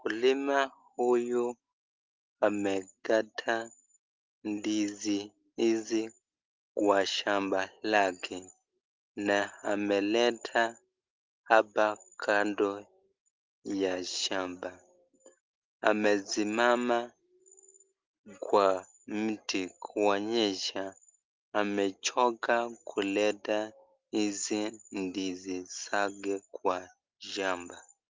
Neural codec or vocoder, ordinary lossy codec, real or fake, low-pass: none; Opus, 32 kbps; real; 7.2 kHz